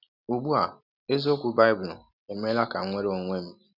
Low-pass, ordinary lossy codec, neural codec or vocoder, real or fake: 5.4 kHz; none; none; real